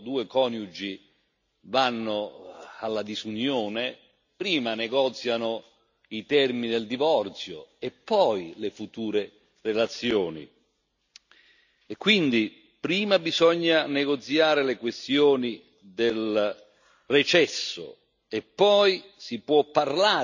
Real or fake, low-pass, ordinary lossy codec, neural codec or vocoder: real; 7.2 kHz; none; none